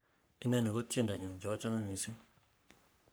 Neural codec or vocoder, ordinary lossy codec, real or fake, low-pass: codec, 44.1 kHz, 3.4 kbps, Pupu-Codec; none; fake; none